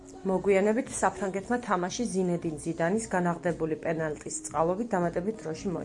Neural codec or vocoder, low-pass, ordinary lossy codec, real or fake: none; 10.8 kHz; MP3, 64 kbps; real